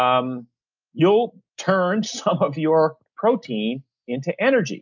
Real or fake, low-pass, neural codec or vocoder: real; 7.2 kHz; none